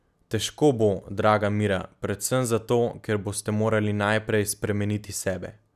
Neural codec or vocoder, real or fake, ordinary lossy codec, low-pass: none; real; none; 14.4 kHz